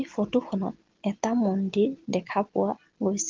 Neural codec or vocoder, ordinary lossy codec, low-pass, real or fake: none; Opus, 16 kbps; 7.2 kHz; real